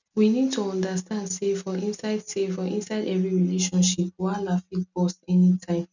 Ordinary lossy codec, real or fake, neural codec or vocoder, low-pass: none; real; none; 7.2 kHz